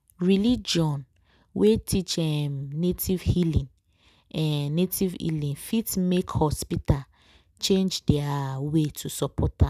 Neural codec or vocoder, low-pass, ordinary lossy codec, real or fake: none; 14.4 kHz; none; real